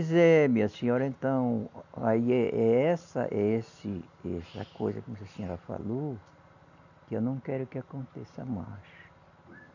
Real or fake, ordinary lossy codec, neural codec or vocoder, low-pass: real; none; none; 7.2 kHz